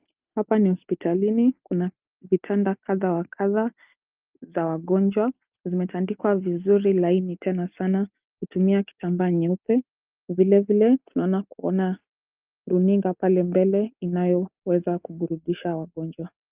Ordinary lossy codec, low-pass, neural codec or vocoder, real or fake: Opus, 32 kbps; 3.6 kHz; none; real